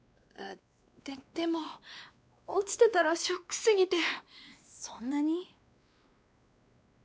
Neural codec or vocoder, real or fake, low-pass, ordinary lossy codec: codec, 16 kHz, 2 kbps, X-Codec, WavLM features, trained on Multilingual LibriSpeech; fake; none; none